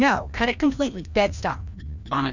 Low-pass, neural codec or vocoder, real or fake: 7.2 kHz; codec, 16 kHz, 1 kbps, FreqCodec, larger model; fake